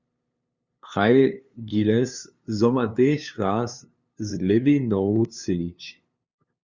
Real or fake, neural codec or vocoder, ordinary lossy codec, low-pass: fake; codec, 16 kHz, 2 kbps, FunCodec, trained on LibriTTS, 25 frames a second; Opus, 64 kbps; 7.2 kHz